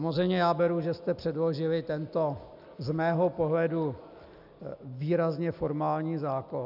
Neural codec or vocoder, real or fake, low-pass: none; real; 5.4 kHz